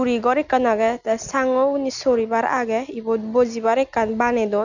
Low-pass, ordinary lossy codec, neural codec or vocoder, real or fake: 7.2 kHz; none; none; real